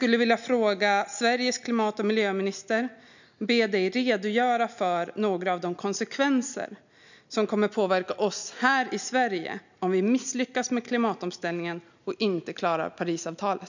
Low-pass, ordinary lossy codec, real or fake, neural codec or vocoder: 7.2 kHz; none; real; none